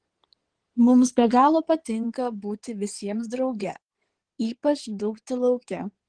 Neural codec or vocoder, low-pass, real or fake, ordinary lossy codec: codec, 16 kHz in and 24 kHz out, 2.2 kbps, FireRedTTS-2 codec; 9.9 kHz; fake; Opus, 16 kbps